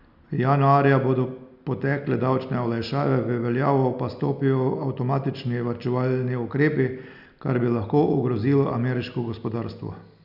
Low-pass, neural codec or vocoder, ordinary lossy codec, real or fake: 5.4 kHz; none; none; real